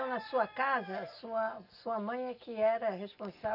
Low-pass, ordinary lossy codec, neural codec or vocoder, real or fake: 5.4 kHz; none; none; real